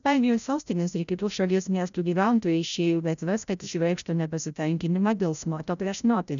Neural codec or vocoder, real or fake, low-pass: codec, 16 kHz, 0.5 kbps, FreqCodec, larger model; fake; 7.2 kHz